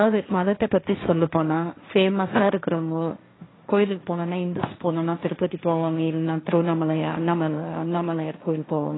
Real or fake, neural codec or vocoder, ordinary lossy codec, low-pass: fake; codec, 16 kHz, 1.1 kbps, Voila-Tokenizer; AAC, 16 kbps; 7.2 kHz